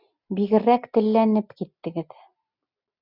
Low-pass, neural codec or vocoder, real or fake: 5.4 kHz; none; real